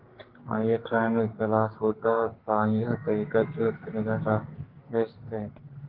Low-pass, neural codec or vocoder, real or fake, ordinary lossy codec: 5.4 kHz; codec, 44.1 kHz, 2.6 kbps, SNAC; fake; Opus, 16 kbps